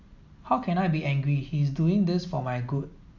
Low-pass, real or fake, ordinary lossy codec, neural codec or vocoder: 7.2 kHz; real; none; none